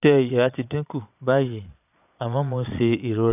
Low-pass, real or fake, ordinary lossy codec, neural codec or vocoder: 3.6 kHz; fake; none; vocoder, 22.05 kHz, 80 mel bands, Vocos